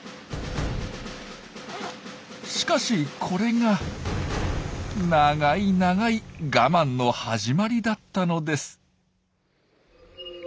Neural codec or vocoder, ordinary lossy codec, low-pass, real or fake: none; none; none; real